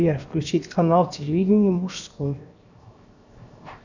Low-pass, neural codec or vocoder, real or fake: 7.2 kHz; codec, 16 kHz, 0.7 kbps, FocalCodec; fake